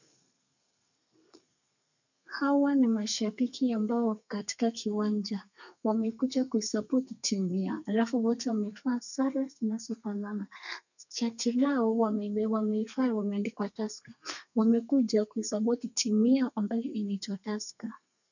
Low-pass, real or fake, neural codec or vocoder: 7.2 kHz; fake; codec, 32 kHz, 1.9 kbps, SNAC